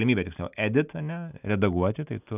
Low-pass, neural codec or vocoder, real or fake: 3.6 kHz; none; real